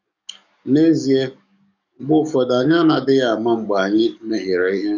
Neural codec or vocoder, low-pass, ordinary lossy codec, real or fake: codec, 44.1 kHz, 7.8 kbps, DAC; 7.2 kHz; none; fake